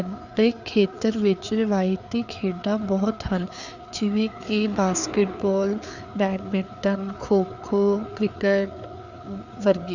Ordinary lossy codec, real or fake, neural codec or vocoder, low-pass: none; fake; codec, 16 kHz, 4 kbps, FreqCodec, larger model; 7.2 kHz